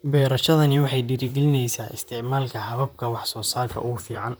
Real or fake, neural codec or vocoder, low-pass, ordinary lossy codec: fake; vocoder, 44.1 kHz, 128 mel bands, Pupu-Vocoder; none; none